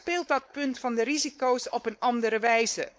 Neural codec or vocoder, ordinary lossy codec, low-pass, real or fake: codec, 16 kHz, 4.8 kbps, FACodec; none; none; fake